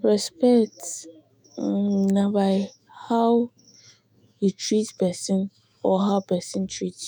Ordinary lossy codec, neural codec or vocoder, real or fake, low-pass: none; autoencoder, 48 kHz, 128 numbers a frame, DAC-VAE, trained on Japanese speech; fake; none